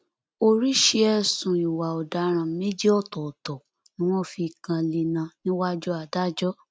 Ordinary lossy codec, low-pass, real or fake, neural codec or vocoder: none; none; real; none